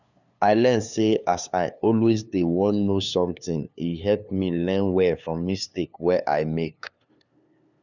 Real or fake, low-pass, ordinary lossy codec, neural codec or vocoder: fake; 7.2 kHz; none; codec, 16 kHz, 2 kbps, FunCodec, trained on LibriTTS, 25 frames a second